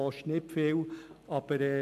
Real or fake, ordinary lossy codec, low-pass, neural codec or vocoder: real; AAC, 96 kbps; 14.4 kHz; none